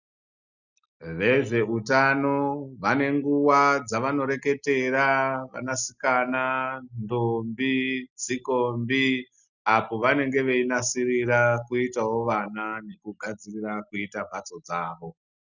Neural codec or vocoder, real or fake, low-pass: none; real; 7.2 kHz